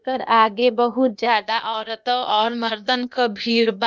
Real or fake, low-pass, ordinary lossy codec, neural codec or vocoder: fake; none; none; codec, 16 kHz, 0.8 kbps, ZipCodec